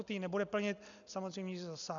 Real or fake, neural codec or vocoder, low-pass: real; none; 7.2 kHz